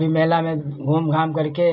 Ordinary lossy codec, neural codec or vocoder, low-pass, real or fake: none; none; 5.4 kHz; real